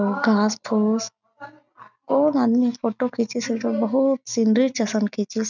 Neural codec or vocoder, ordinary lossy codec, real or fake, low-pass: none; none; real; 7.2 kHz